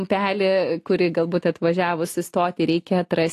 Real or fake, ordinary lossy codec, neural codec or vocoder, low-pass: real; AAC, 48 kbps; none; 14.4 kHz